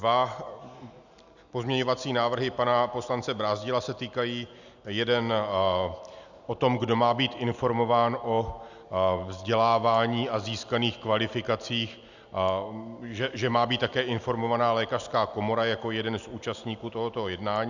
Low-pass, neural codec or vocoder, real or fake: 7.2 kHz; none; real